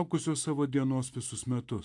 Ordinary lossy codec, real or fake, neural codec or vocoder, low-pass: AAC, 64 kbps; real; none; 10.8 kHz